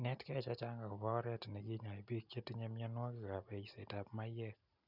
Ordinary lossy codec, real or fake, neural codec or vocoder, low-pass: none; real; none; 5.4 kHz